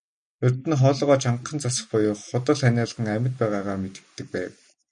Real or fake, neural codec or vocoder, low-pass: real; none; 9.9 kHz